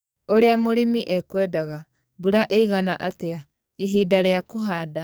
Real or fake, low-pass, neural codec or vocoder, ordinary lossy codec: fake; none; codec, 44.1 kHz, 2.6 kbps, SNAC; none